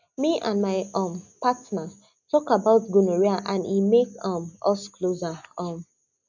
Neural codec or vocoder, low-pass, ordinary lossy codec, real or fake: none; 7.2 kHz; none; real